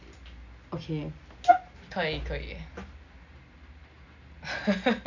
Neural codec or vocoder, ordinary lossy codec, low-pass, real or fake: none; none; 7.2 kHz; real